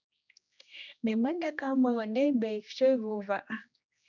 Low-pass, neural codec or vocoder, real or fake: 7.2 kHz; codec, 16 kHz, 1 kbps, X-Codec, HuBERT features, trained on general audio; fake